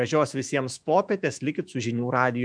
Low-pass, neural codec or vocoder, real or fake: 9.9 kHz; autoencoder, 48 kHz, 128 numbers a frame, DAC-VAE, trained on Japanese speech; fake